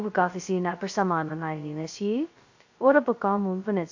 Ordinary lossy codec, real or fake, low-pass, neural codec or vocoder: AAC, 48 kbps; fake; 7.2 kHz; codec, 16 kHz, 0.2 kbps, FocalCodec